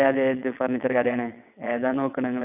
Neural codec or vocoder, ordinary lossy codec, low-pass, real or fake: vocoder, 22.05 kHz, 80 mel bands, WaveNeXt; none; 3.6 kHz; fake